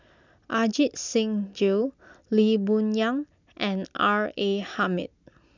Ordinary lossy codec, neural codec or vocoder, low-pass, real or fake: none; none; 7.2 kHz; real